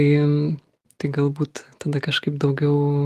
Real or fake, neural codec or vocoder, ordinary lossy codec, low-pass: real; none; Opus, 24 kbps; 14.4 kHz